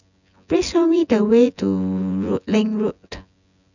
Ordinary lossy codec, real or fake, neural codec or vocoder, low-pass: none; fake; vocoder, 24 kHz, 100 mel bands, Vocos; 7.2 kHz